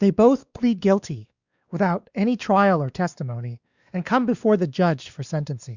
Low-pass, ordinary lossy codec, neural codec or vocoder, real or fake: 7.2 kHz; Opus, 64 kbps; codec, 16 kHz, 2 kbps, X-Codec, WavLM features, trained on Multilingual LibriSpeech; fake